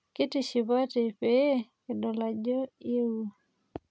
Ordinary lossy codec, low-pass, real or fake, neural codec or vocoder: none; none; real; none